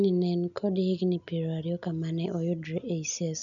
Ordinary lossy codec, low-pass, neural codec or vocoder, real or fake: none; 7.2 kHz; none; real